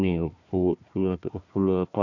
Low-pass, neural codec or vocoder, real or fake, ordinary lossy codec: 7.2 kHz; codec, 16 kHz, 1 kbps, FunCodec, trained on Chinese and English, 50 frames a second; fake; none